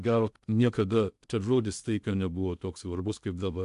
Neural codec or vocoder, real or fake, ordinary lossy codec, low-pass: codec, 16 kHz in and 24 kHz out, 0.6 kbps, FocalCodec, streaming, 2048 codes; fake; AAC, 96 kbps; 10.8 kHz